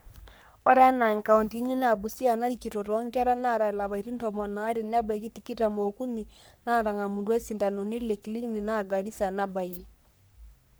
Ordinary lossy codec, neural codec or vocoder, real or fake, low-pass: none; codec, 44.1 kHz, 3.4 kbps, Pupu-Codec; fake; none